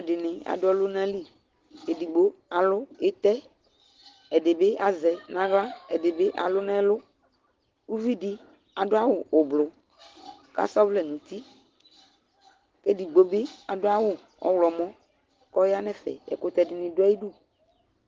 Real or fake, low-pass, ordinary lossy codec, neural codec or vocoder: real; 7.2 kHz; Opus, 16 kbps; none